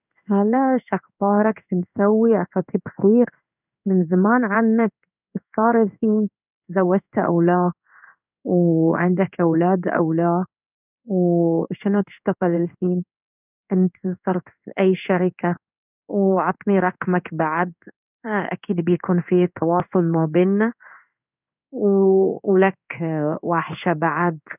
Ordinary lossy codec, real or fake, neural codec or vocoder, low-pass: none; fake; codec, 16 kHz in and 24 kHz out, 1 kbps, XY-Tokenizer; 3.6 kHz